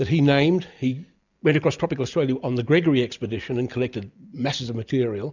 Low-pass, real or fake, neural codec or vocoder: 7.2 kHz; real; none